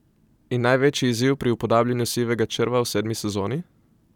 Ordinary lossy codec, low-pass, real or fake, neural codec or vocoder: none; 19.8 kHz; fake; vocoder, 44.1 kHz, 128 mel bands every 256 samples, BigVGAN v2